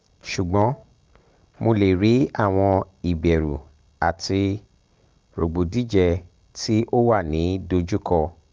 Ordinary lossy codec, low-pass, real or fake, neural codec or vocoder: Opus, 24 kbps; 7.2 kHz; real; none